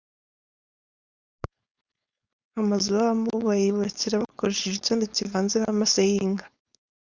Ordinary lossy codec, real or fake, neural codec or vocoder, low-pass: Opus, 64 kbps; fake; codec, 16 kHz, 4.8 kbps, FACodec; 7.2 kHz